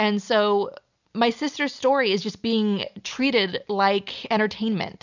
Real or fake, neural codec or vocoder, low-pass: real; none; 7.2 kHz